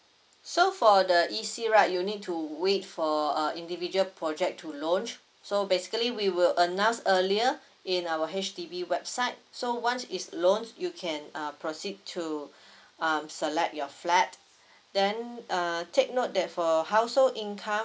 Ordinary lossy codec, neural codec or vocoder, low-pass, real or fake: none; none; none; real